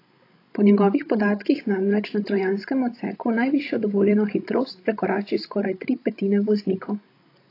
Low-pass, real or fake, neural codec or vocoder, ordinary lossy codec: 5.4 kHz; fake; codec, 16 kHz, 16 kbps, FreqCodec, larger model; AAC, 32 kbps